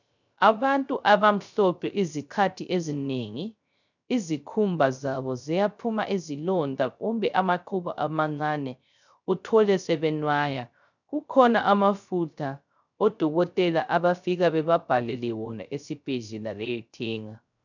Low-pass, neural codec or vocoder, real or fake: 7.2 kHz; codec, 16 kHz, 0.3 kbps, FocalCodec; fake